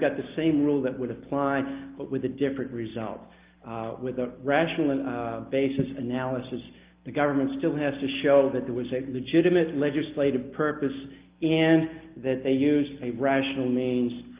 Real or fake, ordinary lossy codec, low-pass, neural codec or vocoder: real; Opus, 24 kbps; 3.6 kHz; none